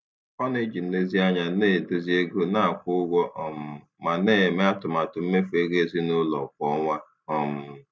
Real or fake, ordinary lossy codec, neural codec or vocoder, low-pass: real; none; none; none